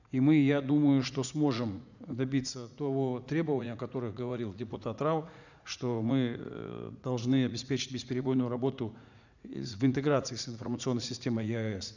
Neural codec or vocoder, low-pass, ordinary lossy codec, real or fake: vocoder, 44.1 kHz, 80 mel bands, Vocos; 7.2 kHz; none; fake